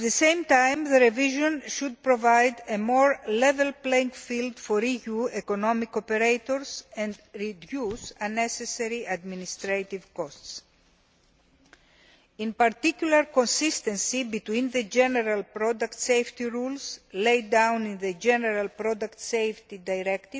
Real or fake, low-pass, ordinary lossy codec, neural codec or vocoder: real; none; none; none